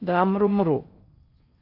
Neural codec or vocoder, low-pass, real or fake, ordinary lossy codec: codec, 16 kHz in and 24 kHz out, 0.6 kbps, FocalCodec, streaming, 4096 codes; 5.4 kHz; fake; AAC, 32 kbps